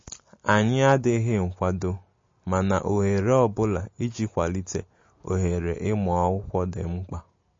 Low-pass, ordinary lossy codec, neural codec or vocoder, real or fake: 7.2 kHz; MP3, 32 kbps; none; real